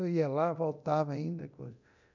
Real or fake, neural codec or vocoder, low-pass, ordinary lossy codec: fake; codec, 24 kHz, 0.9 kbps, DualCodec; 7.2 kHz; none